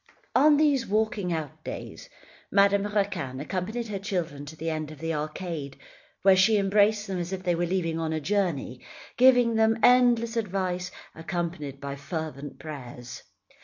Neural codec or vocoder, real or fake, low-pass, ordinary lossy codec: none; real; 7.2 kHz; MP3, 48 kbps